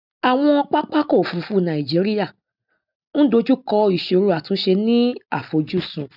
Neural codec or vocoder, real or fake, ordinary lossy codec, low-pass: none; real; none; 5.4 kHz